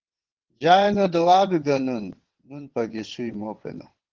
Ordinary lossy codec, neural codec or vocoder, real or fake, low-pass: Opus, 16 kbps; vocoder, 22.05 kHz, 80 mel bands, WaveNeXt; fake; 7.2 kHz